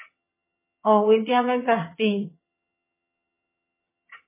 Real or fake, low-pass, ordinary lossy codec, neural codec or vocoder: fake; 3.6 kHz; MP3, 16 kbps; vocoder, 22.05 kHz, 80 mel bands, HiFi-GAN